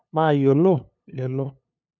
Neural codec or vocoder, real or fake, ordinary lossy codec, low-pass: codec, 16 kHz, 2 kbps, FunCodec, trained on LibriTTS, 25 frames a second; fake; none; 7.2 kHz